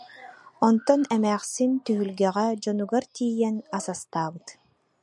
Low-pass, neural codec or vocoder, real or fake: 10.8 kHz; none; real